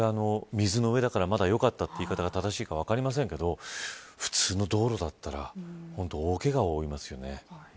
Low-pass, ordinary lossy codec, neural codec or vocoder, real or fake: none; none; none; real